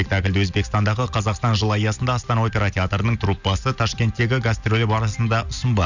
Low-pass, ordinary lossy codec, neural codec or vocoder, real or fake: 7.2 kHz; none; none; real